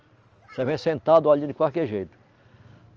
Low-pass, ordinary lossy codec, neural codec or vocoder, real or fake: 7.2 kHz; Opus, 24 kbps; none; real